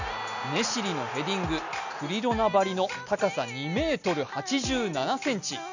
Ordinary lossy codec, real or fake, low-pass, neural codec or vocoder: none; real; 7.2 kHz; none